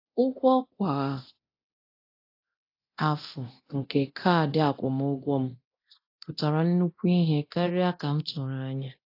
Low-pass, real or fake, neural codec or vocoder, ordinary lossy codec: 5.4 kHz; fake; codec, 24 kHz, 0.9 kbps, DualCodec; AAC, 32 kbps